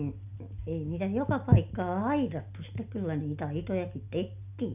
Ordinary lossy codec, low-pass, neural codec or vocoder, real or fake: none; 3.6 kHz; none; real